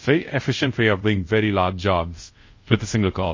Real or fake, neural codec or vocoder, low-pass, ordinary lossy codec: fake; codec, 24 kHz, 0.5 kbps, DualCodec; 7.2 kHz; MP3, 32 kbps